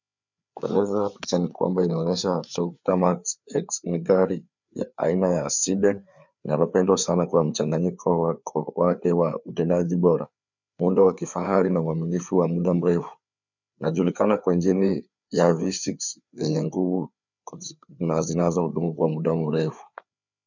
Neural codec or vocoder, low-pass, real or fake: codec, 16 kHz, 4 kbps, FreqCodec, larger model; 7.2 kHz; fake